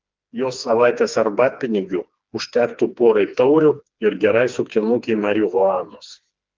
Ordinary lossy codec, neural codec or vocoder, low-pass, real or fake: Opus, 32 kbps; codec, 16 kHz, 2 kbps, FreqCodec, smaller model; 7.2 kHz; fake